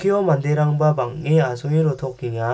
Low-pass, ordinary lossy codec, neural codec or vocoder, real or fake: none; none; none; real